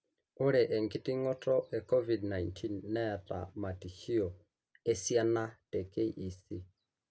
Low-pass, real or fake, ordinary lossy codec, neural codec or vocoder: none; real; none; none